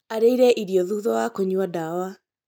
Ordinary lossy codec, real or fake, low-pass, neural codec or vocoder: none; real; none; none